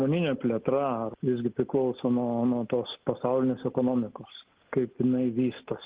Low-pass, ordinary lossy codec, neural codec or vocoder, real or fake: 3.6 kHz; Opus, 16 kbps; none; real